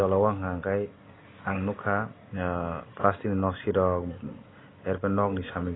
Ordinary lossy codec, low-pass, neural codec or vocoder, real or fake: AAC, 16 kbps; 7.2 kHz; none; real